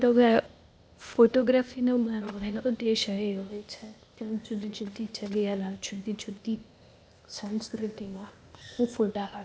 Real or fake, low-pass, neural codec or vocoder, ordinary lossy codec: fake; none; codec, 16 kHz, 0.8 kbps, ZipCodec; none